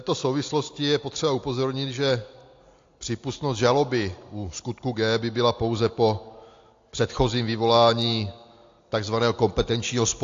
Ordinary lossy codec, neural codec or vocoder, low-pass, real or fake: AAC, 48 kbps; none; 7.2 kHz; real